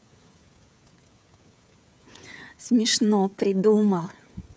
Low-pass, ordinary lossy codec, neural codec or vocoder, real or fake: none; none; codec, 16 kHz, 16 kbps, FreqCodec, smaller model; fake